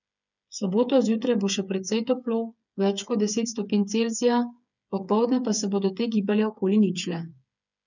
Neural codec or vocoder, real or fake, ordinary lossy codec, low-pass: codec, 16 kHz, 8 kbps, FreqCodec, smaller model; fake; none; 7.2 kHz